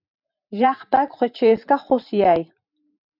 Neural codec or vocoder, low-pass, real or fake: vocoder, 44.1 kHz, 128 mel bands every 512 samples, BigVGAN v2; 5.4 kHz; fake